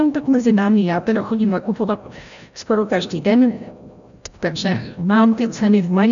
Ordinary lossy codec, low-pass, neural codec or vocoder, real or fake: MP3, 96 kbps; 7.2 kHz; codec, 16 kHz, 0.5 kbps, FreqCodec, larger model; fake